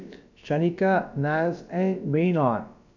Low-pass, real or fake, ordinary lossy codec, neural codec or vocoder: 7.2 kHz; fake; none; codec, 16 kHz, about 1 kbps, DyCAST, with the encoder's durations